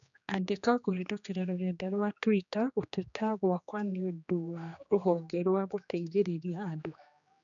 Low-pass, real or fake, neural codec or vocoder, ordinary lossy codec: 7.2 kHz; fake; codec, 16 kHz, 2 kbps, X-Codec, HuBERT features, trained on general audio; none